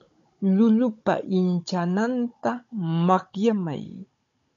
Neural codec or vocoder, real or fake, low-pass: codec, 16 kHz, 16 kbps, FunCodec, trained on Chinese and English, 50 frames a second; fake; 7.2 kHz